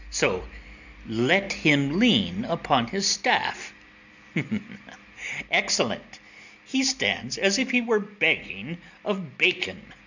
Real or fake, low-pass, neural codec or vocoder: real; 7.2 kHz; none